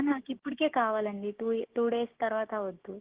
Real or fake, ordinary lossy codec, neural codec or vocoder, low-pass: fake; Opus, 16 kbps; codec, 16 kHz, 6 kbps, DAC; 3.6 kHz